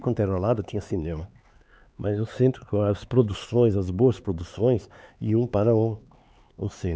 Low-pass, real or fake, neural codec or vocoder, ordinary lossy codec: none; fake; codec, 16 kHz, 4 kbps, X-Codec, HuBERT features, trained on LibriSpeech; none